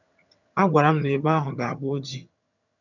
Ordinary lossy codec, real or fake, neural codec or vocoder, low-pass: none; fake; vocoder, 22.05 kHz, 80 mel bands, HiFi-GAN; 7.2 kHz